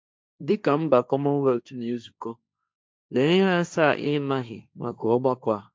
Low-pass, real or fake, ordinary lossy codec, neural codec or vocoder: none; fake; none; codec, 16 kHz, 1.1 kbps, Voila-Tokenizer